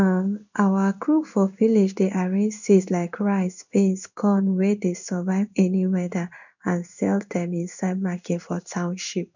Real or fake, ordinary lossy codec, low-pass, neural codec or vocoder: fake; none; 7.2 kHz; codec, 16 kHz in and 24 kHz out, 1 kbps, XY-Tokenizer